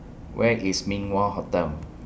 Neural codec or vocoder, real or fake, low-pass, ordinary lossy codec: none; real; none; none